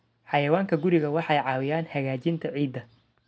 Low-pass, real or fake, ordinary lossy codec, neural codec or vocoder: none; real; none; none